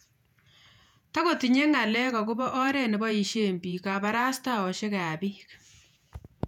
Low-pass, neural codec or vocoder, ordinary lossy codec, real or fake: 19.8 kHz; vocoder, 48 kHz, 128 mel bands, Vocos; none; fake